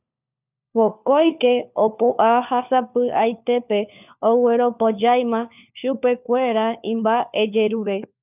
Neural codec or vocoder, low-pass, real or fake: codec, 16 kHz, 4 kbps, FunCodec, trained on LibriTTS, 50 frames a second; 3.6 kHz; fake